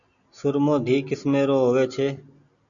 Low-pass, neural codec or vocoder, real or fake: 7.2 kHz; none; real